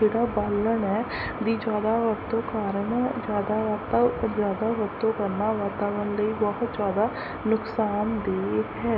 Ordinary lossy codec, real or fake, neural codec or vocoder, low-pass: none; real; none; 5.4 kHz